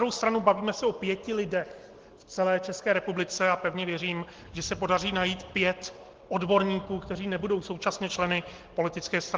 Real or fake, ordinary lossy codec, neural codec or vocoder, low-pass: real; Opus, 16 kbps; none; 7.2 kHz